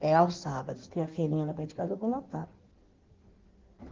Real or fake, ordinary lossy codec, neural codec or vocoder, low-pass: fake; Opus, 16 kbps; codec, 16 kHz in and 24 kHz out, 1.1 kbps, FireRedTTS-2 codec; 7.2 kHz